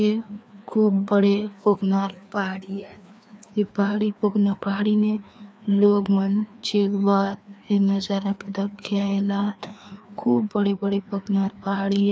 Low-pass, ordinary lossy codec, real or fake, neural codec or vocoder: none; none; fake; codec, 16 kHz, 2 kbps, FreqCodec, larger model